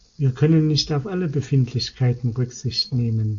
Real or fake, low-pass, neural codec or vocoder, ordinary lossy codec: real; 7.2 kHz; none; AAC, 48 kbps